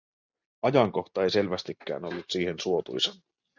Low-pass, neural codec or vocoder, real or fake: 7.2 kHz; none; real